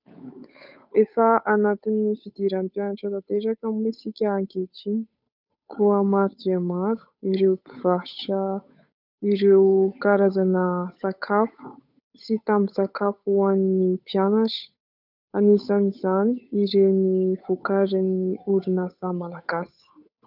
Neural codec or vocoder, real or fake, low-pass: codec, 16 kHz, 8 kbps, FunCodec, trained on Chinese and English, 25 frames a second; fake; 5.4 kHz